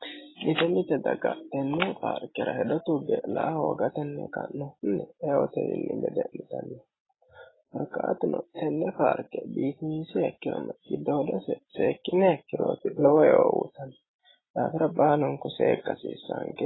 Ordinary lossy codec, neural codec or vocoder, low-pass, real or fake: AAC, 16 kbps; none; 7.2 kHz; real